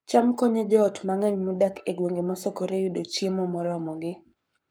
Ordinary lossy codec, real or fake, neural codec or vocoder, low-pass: none; fake; codec, 44.1 kHz, 7.8 kbps, Pupu-Codec; none